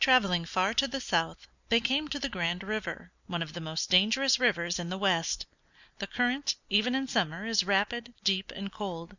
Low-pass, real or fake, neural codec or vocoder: 7.2 kHz; real; none